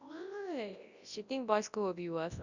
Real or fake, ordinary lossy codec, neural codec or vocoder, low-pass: fake; Opus, 64 kbps; codec, 24 kHz, 0.9 kbps, WavTokenizer, large speech release; 7.2 kHz